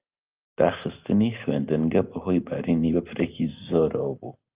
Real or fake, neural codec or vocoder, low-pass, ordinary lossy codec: real; none; 3.6 kHz; Opus, 24 kbps